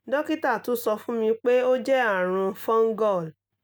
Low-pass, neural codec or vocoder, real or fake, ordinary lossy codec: none; none; real; none